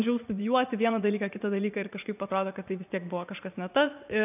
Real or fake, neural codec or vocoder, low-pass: fake; vocoder, 44.1 kHz, 80 mel bands, Vocos; 3.6 kHz